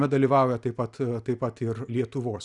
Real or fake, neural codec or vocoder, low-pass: real; none; 10.8 kHz